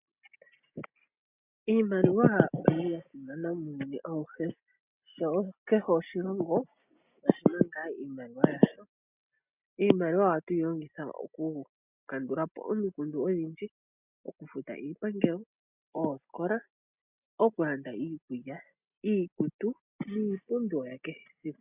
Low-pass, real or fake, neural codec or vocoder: 3.6 kHz; real; none